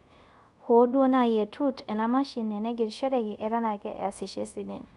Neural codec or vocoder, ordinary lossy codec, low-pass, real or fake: codec, 24 kHz, 0.5 kbps, DualCodec; none; 10.8 kHz; fake